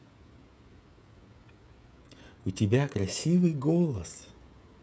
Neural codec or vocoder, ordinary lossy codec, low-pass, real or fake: codec, 16 kHz, 16 kbps, FreqCodec, smaller model; none; none; fake